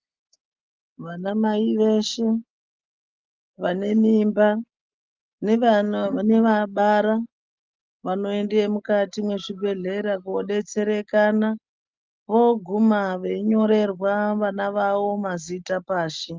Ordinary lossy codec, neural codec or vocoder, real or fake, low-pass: Opus, 24 kbps; none; real; 7.2 kHz